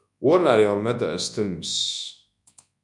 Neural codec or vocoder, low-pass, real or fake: codec, 24 kHz, 0.9 kbps, WavTokenizer, large speech release; 10.8 kHz; fake